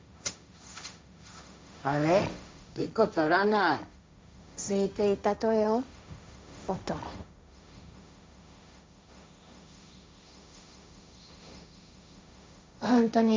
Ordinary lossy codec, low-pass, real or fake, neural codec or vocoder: none; none; fake; codec, 16 kHz, 1.1 kbps, Voila-Tokenizer